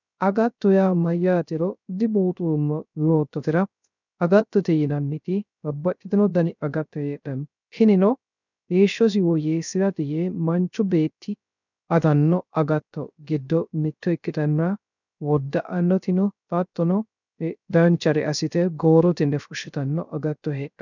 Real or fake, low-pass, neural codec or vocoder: fake; 7.2 kHz; codec, 16 kHz, 0.3 kbps, FocalCodec